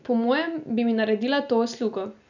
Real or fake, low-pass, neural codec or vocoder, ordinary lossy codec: real; 7.2 kHz; none; none